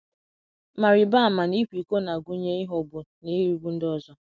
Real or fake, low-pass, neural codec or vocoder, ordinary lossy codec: real; none; none; none